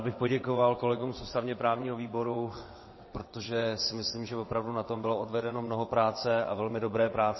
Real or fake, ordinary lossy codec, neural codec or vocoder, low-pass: fake; MP3, 24 kbps; vocoder, 22.05 kHz, 80 mel bands, WaveNeXt; 7.2 kHz